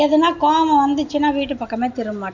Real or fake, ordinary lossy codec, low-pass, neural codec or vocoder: real; none; 7.2 kHz; none